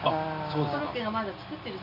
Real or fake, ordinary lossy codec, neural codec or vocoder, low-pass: real; none; none; 5.4 kHz